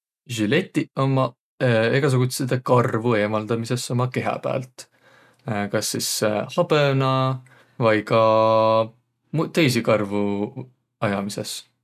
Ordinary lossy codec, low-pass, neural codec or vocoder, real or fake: none; 14.4 kHz; none; real